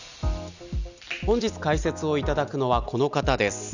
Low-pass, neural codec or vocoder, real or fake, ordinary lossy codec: 7.2 kHz; none; real; none